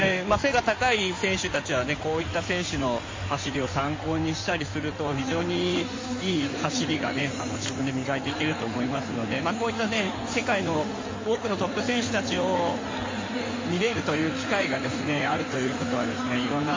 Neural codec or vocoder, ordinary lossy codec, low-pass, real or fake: codec, 16 kHz in and 24 kHz out, 2.2 kbps, FireRedTTS-2 codec; MP3, 32 kbps; 7.2 kHz; fake